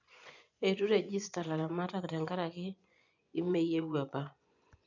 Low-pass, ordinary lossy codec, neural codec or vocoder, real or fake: 7.2 kHz; none; vocoder, 24 kHz, 100 mel bands, Vocos; fake